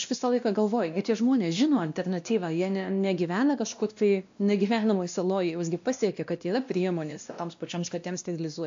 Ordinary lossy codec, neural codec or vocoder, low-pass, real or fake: AAC, 64 kbps; codec, 16 kHz, 1 kbps, X-Codec, WavLM features, trained on Multilingual LibriSpeech; 7.2 kHz; fake